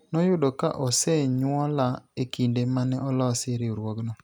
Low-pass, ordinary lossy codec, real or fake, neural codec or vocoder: none; none; real; none